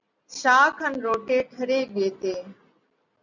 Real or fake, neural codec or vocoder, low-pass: real; none; 7.2 kHz